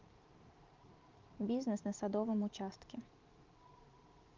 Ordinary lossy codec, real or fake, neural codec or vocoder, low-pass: Opus, 24 kbps; fake; vocoder, 44.1 kHz, 128 mel bands every 512 samples, BigVGAN v2; 7.2 kHz